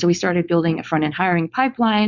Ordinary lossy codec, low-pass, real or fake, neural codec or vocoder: Opus, 64 kbps; 7.2 kHz; fake; codec, 16 kHz, 4.8 kbps, FACodec